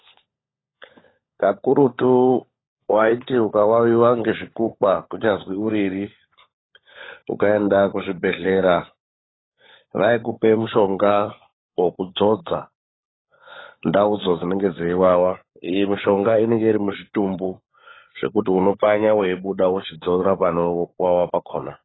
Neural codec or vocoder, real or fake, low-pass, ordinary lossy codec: codec, 16 kHz, 16 kbps, FunCodec, trained on LibriTTS, 50 frames a second; fake; 7.2 kHz; AAC, 16 kbps